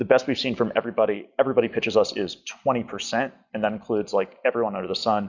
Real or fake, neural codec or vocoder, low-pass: real; none; 7.2 kHz